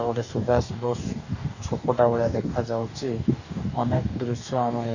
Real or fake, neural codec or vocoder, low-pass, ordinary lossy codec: fake; codec, 44.1 kHz, 2.6 kbps, DAC; 7.2 kHz; none